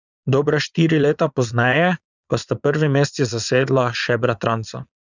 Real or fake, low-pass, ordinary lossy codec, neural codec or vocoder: fake; 7.2 kHz; none; vocoder, 22.05 kHz, 80 mel bands, Vocos